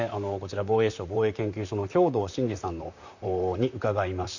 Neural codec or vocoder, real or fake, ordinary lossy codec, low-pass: vocoder, 44.1 kHz, 128 mel bands, Pupu-Vocoder; fake; none; 7.2 kHz